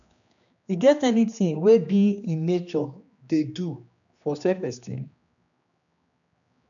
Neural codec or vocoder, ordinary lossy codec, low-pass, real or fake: codec, 16 kHz, 2 kbps, X-Codec, HuBERT features, trained on general audio; none; 7.2 kHz; fake